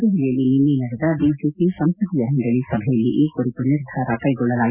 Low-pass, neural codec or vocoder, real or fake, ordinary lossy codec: 3.6 kHz; none; real; Opus, 64 kbps